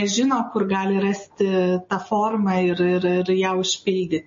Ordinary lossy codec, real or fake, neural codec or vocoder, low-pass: MP3, 32 kbps; real; none; 7.2 kHz